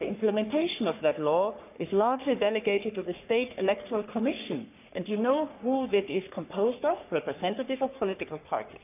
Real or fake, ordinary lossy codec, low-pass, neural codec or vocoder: fake; none; 3.6 kHz; codec, 44.1 kHz, 3.4 kbps, Pupu-Codec